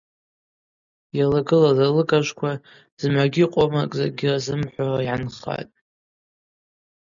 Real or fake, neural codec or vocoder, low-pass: real; none; 7.2 kHz